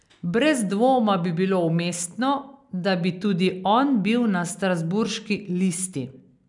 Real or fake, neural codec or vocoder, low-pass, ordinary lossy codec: real; none; 10.8 kHz; none